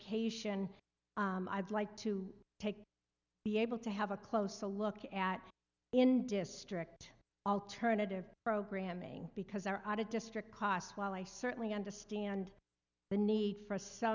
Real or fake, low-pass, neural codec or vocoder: real; 7.2 kHz; none